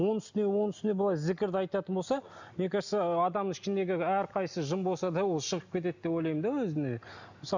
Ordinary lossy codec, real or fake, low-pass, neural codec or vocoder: none; real; 7.2 kHz; none